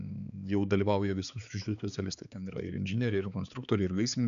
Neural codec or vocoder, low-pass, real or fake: codec, 16 kHz, 4 kbps, X-Codec, HuBERT features, trained on balanced general audio; 7.2 kHz; fake